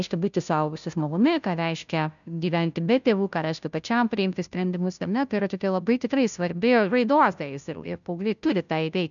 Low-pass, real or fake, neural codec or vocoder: 7.2 kHz; fake; codec, 16 kHz, 0.5 kbps, FunCodec, trained on Chinese and English, 25 frames a second